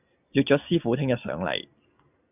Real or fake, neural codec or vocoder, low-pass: real; none; 3.6 kHz